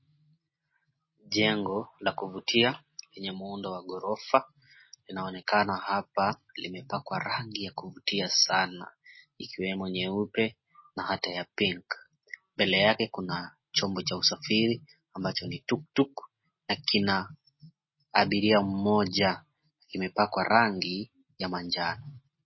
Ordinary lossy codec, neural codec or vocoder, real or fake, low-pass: MP3, 24 kbps; none; real; 7.2 kHz